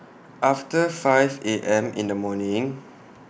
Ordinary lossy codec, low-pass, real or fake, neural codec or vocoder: none; none; real; none